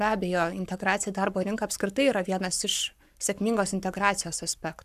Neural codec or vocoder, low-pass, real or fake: codec, 44.1 kHz, 7.8 kbps, Pupu-Codec; 14.4 kHz; fake